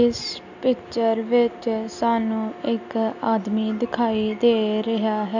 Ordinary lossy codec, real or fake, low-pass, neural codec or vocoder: none; real; 7.2 kHz; none